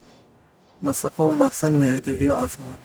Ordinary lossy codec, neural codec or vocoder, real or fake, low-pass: none; codec, 44.1 kHz, 0.9 kbps, DAC; fake; none